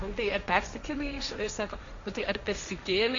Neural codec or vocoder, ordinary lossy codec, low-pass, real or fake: codec, 16 kHz, 1.1 kbps, Voila-Tokenizer; Opus, 64 kbps; 7.2 kHz; fake